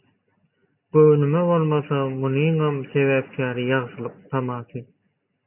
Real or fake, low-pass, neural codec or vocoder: real; 3.6 kHz; none